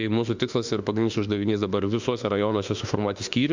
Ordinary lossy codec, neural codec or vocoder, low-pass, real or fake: Opus, 64 kbps; autoencoder, 48 kHz, 32 numbers a frame, DAC-VAE, trained on Japanese speech; 7.2 kHz; fake